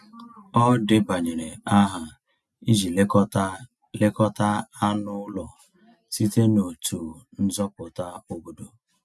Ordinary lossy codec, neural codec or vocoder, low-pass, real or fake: none; none; none; real